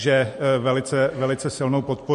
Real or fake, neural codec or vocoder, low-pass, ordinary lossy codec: real; none; 14.4 kHz; MP3, 48 kbps